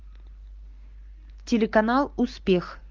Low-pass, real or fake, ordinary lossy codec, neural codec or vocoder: 7.2 kHz; real; Opus, 32 kbps; none